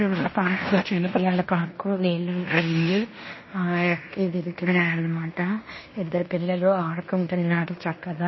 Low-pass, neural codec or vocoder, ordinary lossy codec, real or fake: 7.2 kHz; codec, 16 kHz in and 24 kHz out, 0.9 kbps, LongCat-Audio-Codec, fine tuned four codebook decoder; MP3, 24 kbps; fake